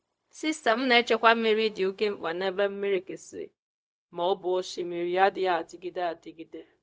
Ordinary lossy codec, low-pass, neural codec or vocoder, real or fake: none; none; codec, 16 kHz, 0.4 kbps, LongCat-Audio-Codec; fake